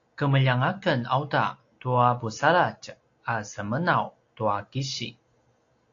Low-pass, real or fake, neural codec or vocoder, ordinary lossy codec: 7.2 kHz; real; none; AAC, 48 kbps